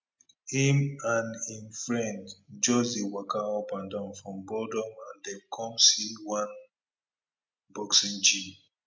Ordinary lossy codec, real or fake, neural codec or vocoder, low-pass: none; real; none; none